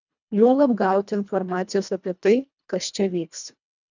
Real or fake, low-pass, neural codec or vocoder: fake; 7.2 kHz; codec, 24 kHz, 1.5 kbps, HILCodec